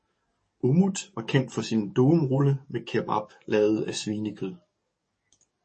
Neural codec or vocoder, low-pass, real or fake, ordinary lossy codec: codec, 44.1 kHz, 7.8 kbps, DAC; 10.8 kHz; fake; MP3, 32 kbps